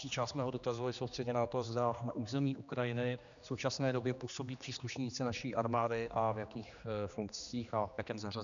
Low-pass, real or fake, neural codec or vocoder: 7.2 kHz; fake; codec, 16 kHz, 2 kbps, X-Codec, HuBERT features, trained on general audio